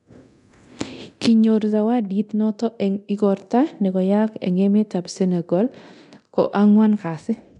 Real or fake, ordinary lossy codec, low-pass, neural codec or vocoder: fake; none; 10.8 kHz; codec, 24 kHz, 0.9 kbps, DualCodec